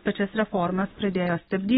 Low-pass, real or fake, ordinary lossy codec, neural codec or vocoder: 10.8 kHz; real; AAC, 16 kbps; none